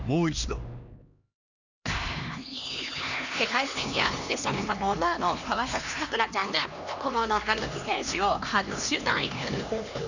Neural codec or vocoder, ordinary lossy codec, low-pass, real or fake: codec, 16 kHz, 2 kbps, X-Codec, HuBERT features, trained on LibriSpeech; none; 7.2 kHz; fake